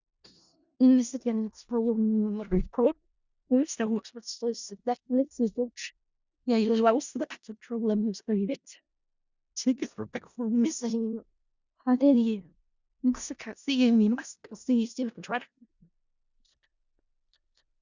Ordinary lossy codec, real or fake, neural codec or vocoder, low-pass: Opus, 64 kbps; fake; codec, 16 kHz in and 24 kHz out, 0.4 kbps, LongCat-Audio-Codec, four codebook decoder; 7.2 kHz